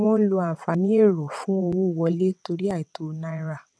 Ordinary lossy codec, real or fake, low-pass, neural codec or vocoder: none; fake; none; vocoder, 22.05 kHz, 80 mel bands, WaveNeXt